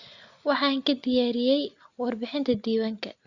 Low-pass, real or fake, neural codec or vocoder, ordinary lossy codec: 7.2 kHz; real; none; Opus, 64 kbps